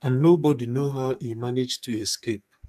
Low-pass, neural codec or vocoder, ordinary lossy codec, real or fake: 14.4 kHz; codec, 44.1 kHz, 2.6 kbps, SNAC; MP3, 96 kbps; fake